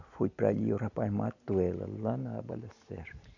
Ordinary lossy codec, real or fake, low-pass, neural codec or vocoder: none; real; 7.2 kHz; none